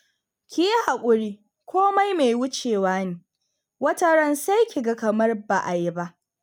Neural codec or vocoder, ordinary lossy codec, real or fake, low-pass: none; none; real; none